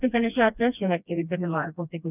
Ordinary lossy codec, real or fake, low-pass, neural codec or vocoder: none; fake; 3.6 kHz; codec, 16 kHz, 1 kbps, FreqCodec, smaller model